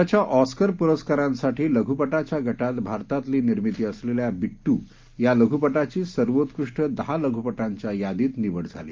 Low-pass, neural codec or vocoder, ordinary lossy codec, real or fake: 7.2 kHz; none; Opus, 32 kbps; real